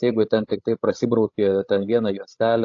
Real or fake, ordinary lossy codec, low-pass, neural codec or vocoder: fake; AAC, 48 kbps; 7.2 kHz; codec, 16 kHz, 16 kbps, FreqCodec, larger model